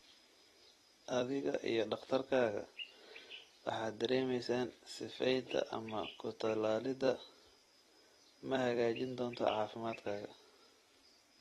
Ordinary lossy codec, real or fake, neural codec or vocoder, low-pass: AAC, 32 kbps; fake; vocoder, 44.1 kHz, 128 mel bands every 256 samples, BigVGAN v2; 19.8 kHz